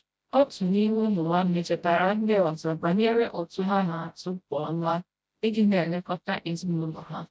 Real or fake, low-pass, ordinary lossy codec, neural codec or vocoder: fake; none; none; codec, 16 kHz, 0.5 kbps, FreqCodec, smaller model